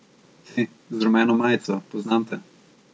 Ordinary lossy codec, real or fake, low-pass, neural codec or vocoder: none; real; none; none